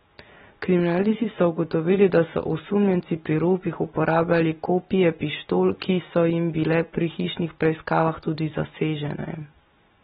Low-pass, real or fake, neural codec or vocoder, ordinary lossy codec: 9.9 kHz; real; none; AAC, 16 kbps